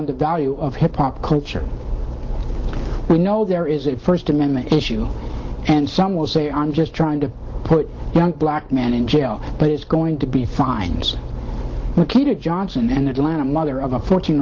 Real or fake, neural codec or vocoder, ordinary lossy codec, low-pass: real; none; Opus, 16 kbps; 7.2 kHz